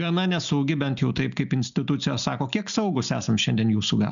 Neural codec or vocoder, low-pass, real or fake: none; 7.2 kHz; real